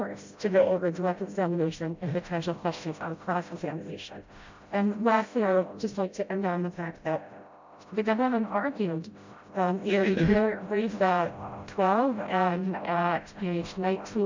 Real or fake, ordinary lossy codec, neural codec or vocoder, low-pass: fake; MP3, 64 kbps; codec, 16 kHz, 0.5 kbps, FreqCodec, smaller model; 7.2 kHz